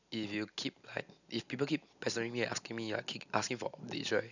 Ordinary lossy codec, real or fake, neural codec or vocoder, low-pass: none; real; none; 7.2 kHz